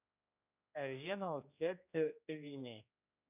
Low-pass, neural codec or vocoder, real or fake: 3.6 kHz; codec, 16 kHz, 1 kbps, X-Codec, HuBERT features, trained on general audio; fake